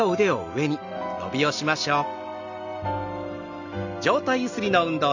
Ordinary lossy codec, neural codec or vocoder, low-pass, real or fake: none; none; 7.2 kHz; real